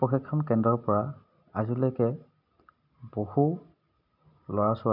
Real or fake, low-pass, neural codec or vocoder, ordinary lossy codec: real; 5.4 kHz; none; none